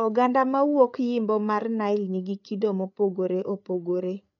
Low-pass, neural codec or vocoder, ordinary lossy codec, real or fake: 7.2 kHz; codec, 16 kHz, 8 kbps, FreqCodec, larger model; MP3, 64 kbps; fake